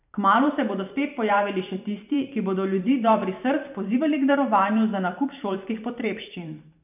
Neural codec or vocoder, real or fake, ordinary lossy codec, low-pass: vocoder, 44.1 kHz, 128 mel bands every 512 samples, BigVGAN v2; fake; none; 3.6 kHz